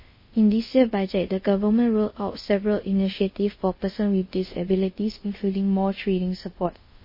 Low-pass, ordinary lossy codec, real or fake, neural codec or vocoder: 5.4 kHz; MP3, 24 kbps; fake; codec, 24 kHz, 0.5 kbps, DualCodec